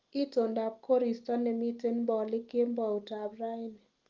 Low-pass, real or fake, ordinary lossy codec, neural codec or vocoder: 7.2 kHz; real; Opus, 24 kbps; none